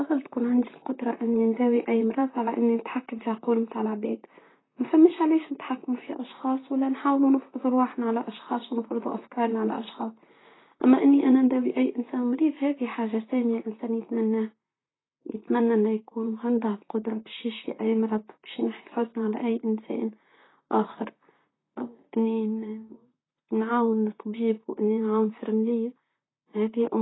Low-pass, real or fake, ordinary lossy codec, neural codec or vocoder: 7.2 kHz; fake; AAC, 16 kbps; vocoder, 44.1 kHz, 128 mel bands, Pupu-Vocoder